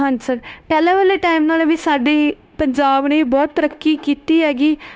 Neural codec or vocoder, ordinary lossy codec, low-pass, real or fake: codec, 16 kHz, 0.9 kbps, LongCat-Audio-Codec; none; none; fake